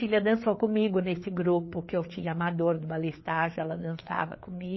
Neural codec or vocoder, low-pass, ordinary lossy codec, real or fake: codec, 16 kHz, 4 kbps, FreqCodec, larger model; 7.2 kHz; MP3, 24 kbps; fake